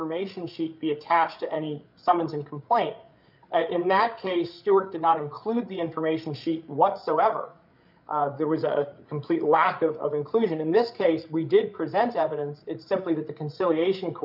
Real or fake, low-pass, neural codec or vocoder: fake; 5.4 kHz; codec, 16 kHz, 8 kbps, FreqCodec, larger model